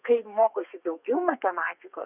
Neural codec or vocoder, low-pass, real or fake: codec, 32 kHz, 1.9 kbps, SNAC; 3.6 kHz; fake